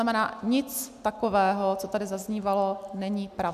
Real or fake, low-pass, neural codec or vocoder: real; 14.4 kHz; none